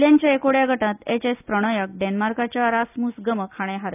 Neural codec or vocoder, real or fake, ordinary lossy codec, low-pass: none; real; none; 3.6 kHz